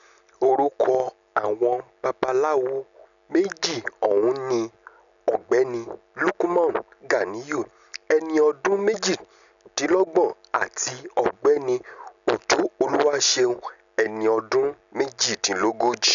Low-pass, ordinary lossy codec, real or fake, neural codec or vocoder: 7.2 kHz; none; real; none